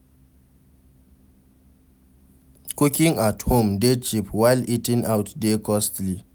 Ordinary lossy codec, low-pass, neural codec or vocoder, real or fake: none; none; none; real